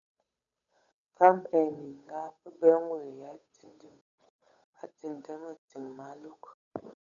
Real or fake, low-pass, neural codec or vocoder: fake; 7.2 kHz; codec, 16 kHz, 8 kbps, FunCodec, trained on Chinese and English, 25 frames a second